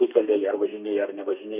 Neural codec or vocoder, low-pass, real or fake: codec, 32 kHz, 1.9 kbps, SNAC; 3.6 kHz; fake